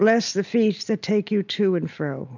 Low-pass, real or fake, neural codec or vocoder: 7.2 kHz; real; none